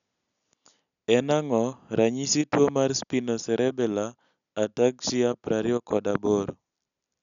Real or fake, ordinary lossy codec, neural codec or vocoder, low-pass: real; none; none; 7.2 kHz